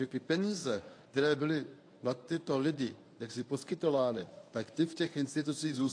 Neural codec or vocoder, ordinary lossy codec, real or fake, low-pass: codec, 24 kHz, 0.9 kbps, WavTokenizer, medium speech release version 1; AAC, 48 kbps; fake; 9.9 kHz